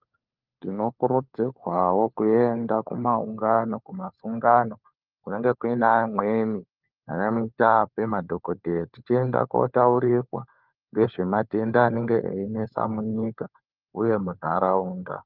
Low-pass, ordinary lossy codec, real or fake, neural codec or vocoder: 5.4 kHz; Opus, 24 kbps; fake; codec, 16 kHz, 4 kbps, FunCodec, trained on LibriTTS, 50 frames a second